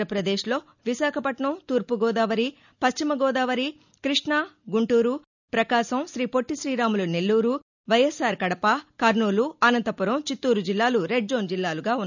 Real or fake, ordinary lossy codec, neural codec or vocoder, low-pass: real; none; none; 7.2 kHz